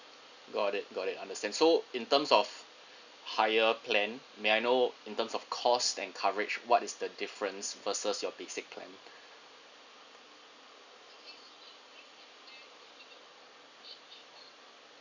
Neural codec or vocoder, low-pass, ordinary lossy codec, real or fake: none; 7.2 kHz; none; real